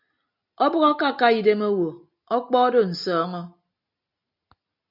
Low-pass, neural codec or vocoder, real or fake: 5.4 kHz; none; real